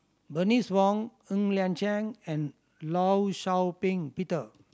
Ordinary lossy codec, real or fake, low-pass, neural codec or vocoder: none; real; none; none